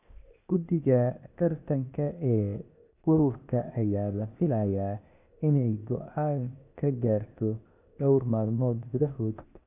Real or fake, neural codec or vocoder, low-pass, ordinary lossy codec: fake; codec, 16 kHz, 0.8 kbps, ZipCodec; 3.6 kHz; none